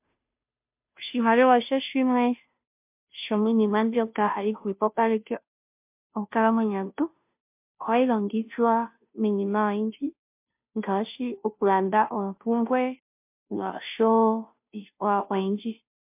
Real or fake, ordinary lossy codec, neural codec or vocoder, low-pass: fake; MP3, 32 kbps; codec, 16 kHz, 0.5 kbps, FunCodec, trained on Chinese and English, 25 frames a second; 3.6 kHz